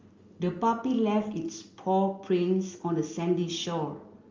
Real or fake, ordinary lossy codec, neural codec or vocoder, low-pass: real; Opus, 32 kbps; none; 7.2 kHz